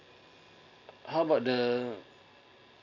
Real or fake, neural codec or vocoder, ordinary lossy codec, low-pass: real; none; none; 7.2 kHz